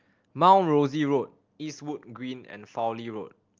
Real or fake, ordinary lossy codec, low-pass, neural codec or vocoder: real; Opus, 32 kbps; 7.2 kHz; none